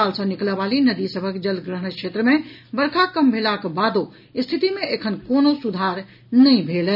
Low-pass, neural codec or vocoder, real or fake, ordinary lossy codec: 5.4 kHz; none; real; none